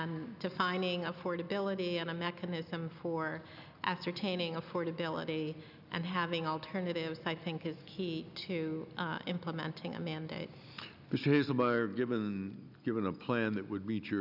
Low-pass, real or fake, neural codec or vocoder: 5.4 kHz; real; none